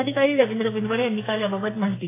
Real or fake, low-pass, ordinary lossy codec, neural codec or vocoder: fake; 3.6 kHz; AAC, 16 kbps; codec, 44.1 kHz, 2.6 kbps, SNAC